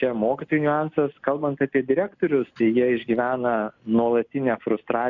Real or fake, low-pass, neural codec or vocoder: real; 7.2 kHz; none